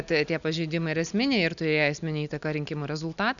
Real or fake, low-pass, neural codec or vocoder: real; 7.2 kHz; none